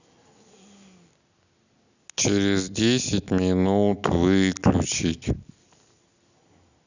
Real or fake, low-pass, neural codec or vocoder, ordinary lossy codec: real; 7.2 kHz; none; none